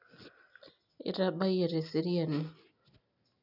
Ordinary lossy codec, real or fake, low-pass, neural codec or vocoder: none; real; 5.4 kHz; none